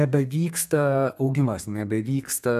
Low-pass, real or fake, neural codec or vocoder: 14.4 kHz; fake; codec, 32 kHz, 1.9 kbps, SNAC